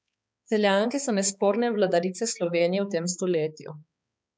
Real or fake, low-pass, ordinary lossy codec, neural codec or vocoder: fake; none; none; codec, 16 kHz, 4 kbps, X-Codec, HuBERT features, trained on balanced general audio